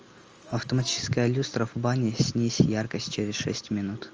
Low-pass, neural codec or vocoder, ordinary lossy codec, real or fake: 7.2 kHz; none; Opus, 24 kbps; real